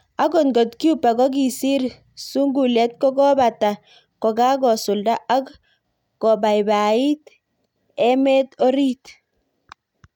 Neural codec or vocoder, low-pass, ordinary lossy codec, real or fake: none; 19.8 kHz; none; real